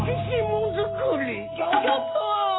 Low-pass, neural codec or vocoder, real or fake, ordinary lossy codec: 7.2 kHz; none; real; AAC, 16 kbps